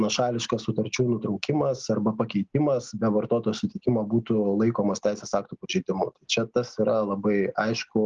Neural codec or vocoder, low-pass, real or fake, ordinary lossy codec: none; 7.2 kHz; real; Opus, 32 kbps